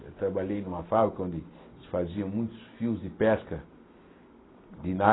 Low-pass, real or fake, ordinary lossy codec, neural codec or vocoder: 7.2 kHz; real; AAC, 16 kbps; none